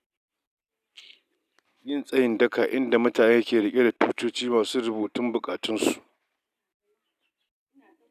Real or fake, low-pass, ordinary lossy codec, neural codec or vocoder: real; 14.4 kHz; none; none